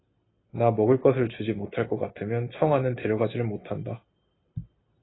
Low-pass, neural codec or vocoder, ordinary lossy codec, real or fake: 7.2 kHz; none; AAC, 16 kbps; real